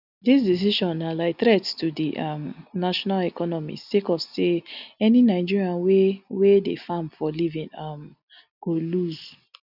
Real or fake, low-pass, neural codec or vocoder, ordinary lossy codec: real; 5.4 kHz; none; none